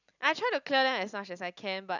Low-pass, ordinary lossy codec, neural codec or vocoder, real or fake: 7.2 kHz; none; none; real